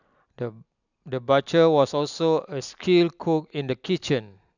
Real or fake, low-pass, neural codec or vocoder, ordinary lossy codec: real; 7.2 kHz; none; none